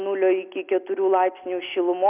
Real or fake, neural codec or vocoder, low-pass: real; none; 3.6 kHz